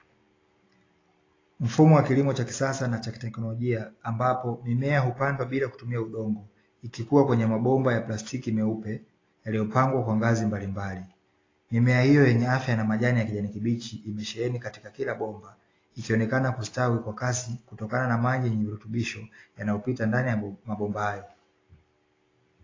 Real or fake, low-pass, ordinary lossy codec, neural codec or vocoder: real; 7.2 kHz; AAC, 32 kbps; none